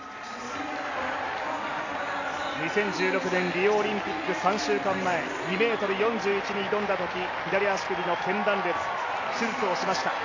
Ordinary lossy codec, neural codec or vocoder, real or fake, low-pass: AAC, 48 kbps; none; real; 7.2 kHz